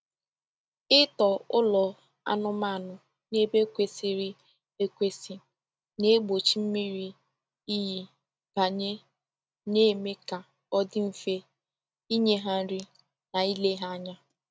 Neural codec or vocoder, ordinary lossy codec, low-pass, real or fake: none; none; none; real